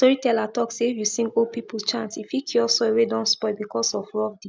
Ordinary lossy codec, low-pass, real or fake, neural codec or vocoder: none; none; real; none